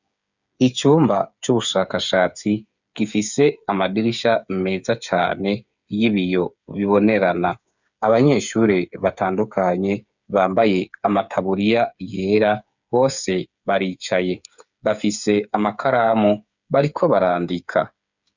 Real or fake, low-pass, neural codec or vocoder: fake; 7.2 kHz; codec, 16 kHz, 8 kbps, FreqCodec, smaller model